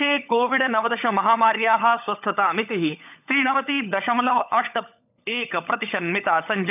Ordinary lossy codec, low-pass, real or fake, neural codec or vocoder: none; 3.6 kHz; fake; codec, 16 kHz, 16 kbps, FunCodec, trained on LibriTTS, 50 frames a second